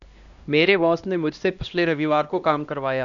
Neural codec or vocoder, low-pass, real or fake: codec, 16 kHz, 1 kbps, X-Codec, HuBERT features, trained on LibriSpeech; 7.2 kHz; fake